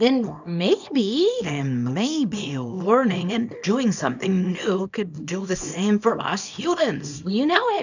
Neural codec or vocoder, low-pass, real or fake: codec, 24 kHz, 0.9 kbps, WavTokenizer, small release; 7.2 kHz; fake